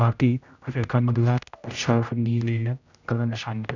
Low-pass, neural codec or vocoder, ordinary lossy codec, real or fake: 7.2 kHz; codec, 16 kHz, 0.5 kbps, X-Codec, HuBERT features, trained on general audio; none; fake